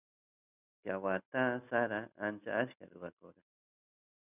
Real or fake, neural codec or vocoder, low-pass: real; none; 3.6 kHz